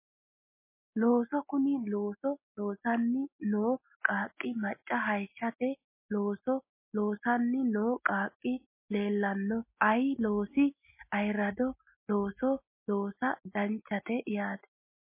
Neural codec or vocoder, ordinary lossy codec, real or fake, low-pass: none; MP3, 24 kbps; real; 3.6 kHz